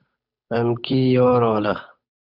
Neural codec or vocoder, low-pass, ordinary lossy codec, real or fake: codec, 16 kHz, 8 kbps, FunCodec, trained on Chinese and English, 25 frames a second; 5.4 kHz; Opus, 64 kbps; fake